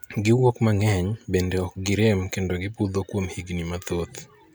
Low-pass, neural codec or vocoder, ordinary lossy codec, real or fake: none; none; none; real